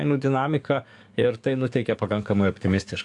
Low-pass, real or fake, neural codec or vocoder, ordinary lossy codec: 10.8 kHz; fake; codec, 44.1 kHz, 7.8 kbps, DAC; AAC, 64 kbps